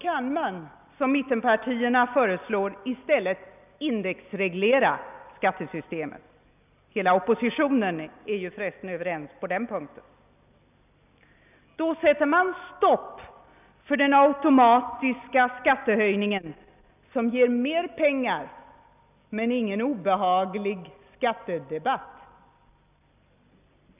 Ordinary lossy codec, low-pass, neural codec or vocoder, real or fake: none; 3.6 kHz; none; real